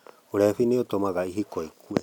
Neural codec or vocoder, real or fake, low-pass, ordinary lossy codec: none; real; 19.8 kHz; none